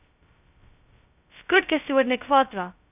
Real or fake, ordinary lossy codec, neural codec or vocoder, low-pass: fake; none; codec, 16 kHz, 0.2 kbps, FocalCodec; 3.6 kHz